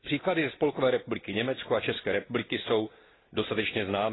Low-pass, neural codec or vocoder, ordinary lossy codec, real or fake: 7.2 kHz; none; AAC, 16 kbps; real